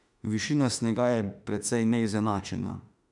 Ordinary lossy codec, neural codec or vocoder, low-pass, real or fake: MP3, 96 kbps; autoencoder, 48 kHz, 32 numbers a frame, DAC-VAE, trained on Japanese speech; 10.8 kHz; fake